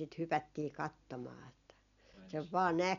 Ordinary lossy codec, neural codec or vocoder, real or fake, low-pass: none; none; real; 7.2 kHz